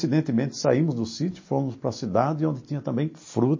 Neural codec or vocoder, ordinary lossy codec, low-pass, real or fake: none; MP3, 32 kbps; 7.2 kHz; real